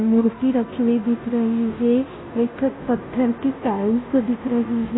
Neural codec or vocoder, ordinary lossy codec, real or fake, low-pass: codec, 16 kHz, 0.5 kbps, FunCodec, trained on Chinese and English, 25 frames a second; AAC, 16 kbps; fake; 7.2 kHz